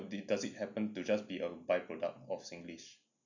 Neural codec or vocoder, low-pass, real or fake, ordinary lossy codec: none; 7.2 kHz; real; none